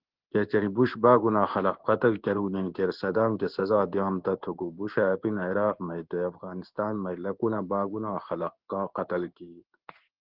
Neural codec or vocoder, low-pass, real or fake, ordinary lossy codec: codec, 16 kHz in and 24 kHz out, 1 kbps, XY-Tokenizer; 5.4 kHz; fake; Opus, 16 kbps